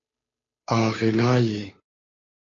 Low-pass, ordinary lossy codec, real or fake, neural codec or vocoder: 7.2 kHz; AAC, 32 kbps; fake; codec, 16 kHz, 2 kbps, FunCodec, trained on Chinese and English, 25 frames a second